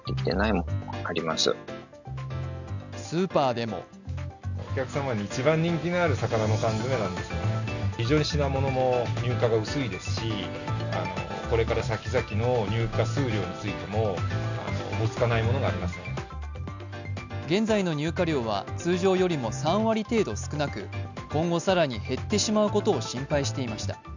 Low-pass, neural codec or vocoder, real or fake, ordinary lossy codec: 7.2 kHz; none; real; none